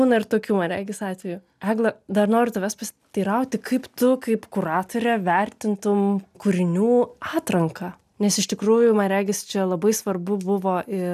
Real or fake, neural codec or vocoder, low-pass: real; none; 14.4 kHz